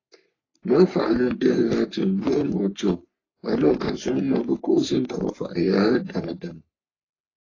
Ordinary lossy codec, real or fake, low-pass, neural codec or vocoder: AAC, 32 kbps; fake; 7.2 kHz; codec, 44.1 kHz, 3.4 kbps, Pupu-Codec